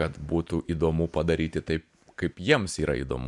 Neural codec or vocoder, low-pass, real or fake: none; 10.8 kHz; real